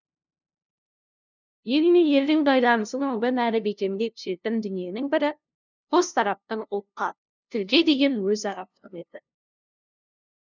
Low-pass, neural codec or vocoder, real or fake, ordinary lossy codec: 7.2 kHz; codec, 16 kHz, 0.5 kbps, FunCodec, trained on LibriTTS, 25 frames a second; fake; none